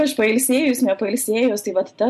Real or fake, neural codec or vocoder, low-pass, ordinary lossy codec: real; none; 14.4 kHz; MP3, 64 kbps